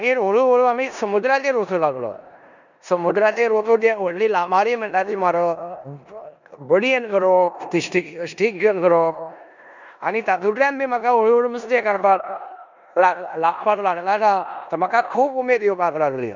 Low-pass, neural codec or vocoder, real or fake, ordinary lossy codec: 7.2 kHz; codec, 16 kHz in and 24 kHz out, 0.9 kbps, LongCat-Audio-Codec, four codebook decoder; fake; none